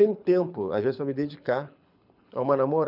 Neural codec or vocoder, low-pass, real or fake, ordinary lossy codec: codec, 24 kHz, 6 kbps, HILCodec; 5.4 kHz; fake; none